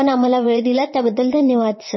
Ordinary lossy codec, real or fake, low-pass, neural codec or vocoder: MP3, 24 kbps; fake; 7.2 kHz; vocoder, 22.05 kHz, 80 mel bands, WaveNeXt